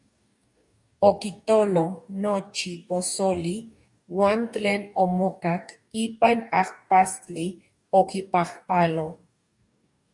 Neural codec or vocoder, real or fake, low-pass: codec, 44.1 kHz, 2.6 kbps, DAC; fake; 10.8 kHz